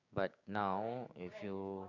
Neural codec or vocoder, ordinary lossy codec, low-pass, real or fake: codec, 16 kHz, 6 kbps, DAC; AAC, 48 kbps; 7.2 kHz; fake